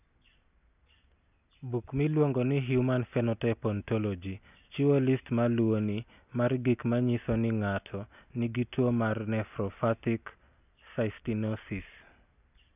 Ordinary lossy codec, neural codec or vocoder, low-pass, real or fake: none; none; 3.6 kHz; real